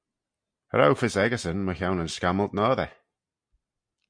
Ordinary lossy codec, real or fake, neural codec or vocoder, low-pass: MP3, 64 kbps; real; none; 9.9 kHz